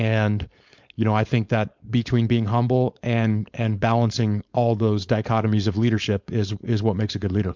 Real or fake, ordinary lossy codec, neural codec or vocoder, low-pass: fake; MP3, 64 kbps; codec, 16 kHz, 4.8 kbps, FACodec; 7.2 kHz